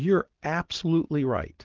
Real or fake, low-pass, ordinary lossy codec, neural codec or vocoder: real; 7.2 kHz; Opus, 16 kbps; none